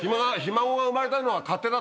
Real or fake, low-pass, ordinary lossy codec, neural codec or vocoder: real; none; none; none